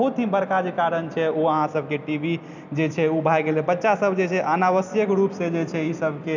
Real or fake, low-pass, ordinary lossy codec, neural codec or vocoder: real; 7.2 kHz; none; none